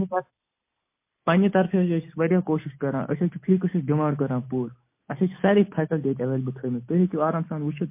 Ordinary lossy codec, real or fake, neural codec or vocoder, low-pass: MP3, 24 kbps; real; none; 3.6 kHz